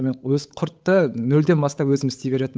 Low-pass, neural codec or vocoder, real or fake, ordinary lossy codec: none; codec, 16 kHz, 8 kbps, FunCodec, trained on Chinese and English, 25 frames a second; fake; none